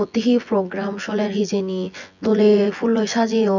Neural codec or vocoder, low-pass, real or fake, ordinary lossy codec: vocoder, 24 kHz, 100 mel bands, Vocos; 7.2 kHz; fake; none